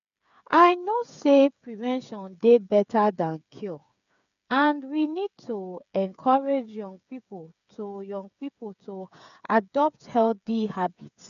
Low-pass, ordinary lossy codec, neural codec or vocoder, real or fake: 7.2 kHz; none; codec, 16 kHz, 8 kbps, FreqCodec, smaller model; fake